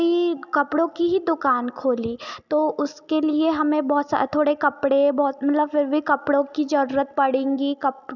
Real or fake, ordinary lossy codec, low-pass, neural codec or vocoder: real; none; 7.2 kHz; none